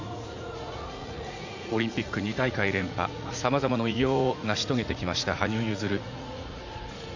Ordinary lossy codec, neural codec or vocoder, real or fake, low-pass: none; vocoder, 44.1 kHz, 80 mel bands, Vocos; fake; 7.2 kHz